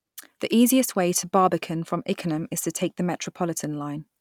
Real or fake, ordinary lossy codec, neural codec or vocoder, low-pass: real; none; none; 19.8 kHz